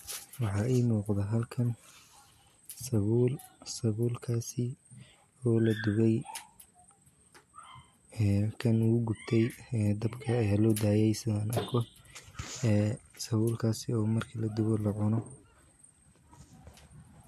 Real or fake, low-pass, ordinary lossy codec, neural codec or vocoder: real; 14.4 kHz; MP3, 64 kbps; none